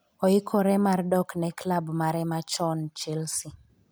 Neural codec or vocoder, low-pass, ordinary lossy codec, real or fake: none; none; none; real